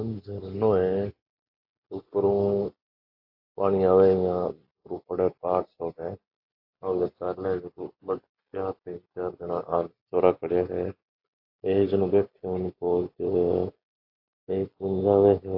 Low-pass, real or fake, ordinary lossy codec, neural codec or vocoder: 5.4 kHz; real; AAC, 48 kbps; none